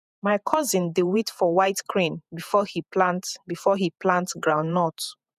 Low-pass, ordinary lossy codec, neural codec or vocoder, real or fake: 14.4 kHz; none; vocoder, 48 kHz, 128 mel bands, Vocos; fake